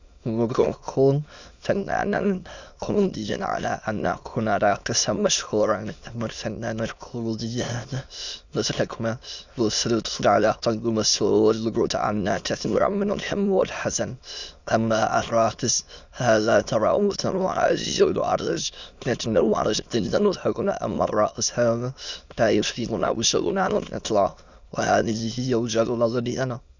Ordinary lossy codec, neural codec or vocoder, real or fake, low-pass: Opus, 64 kbps; autoencoder, 22.05 kHz, a latent of 192 numbers a frame, VITS, trained on many speakers; fake; 7.2 kHz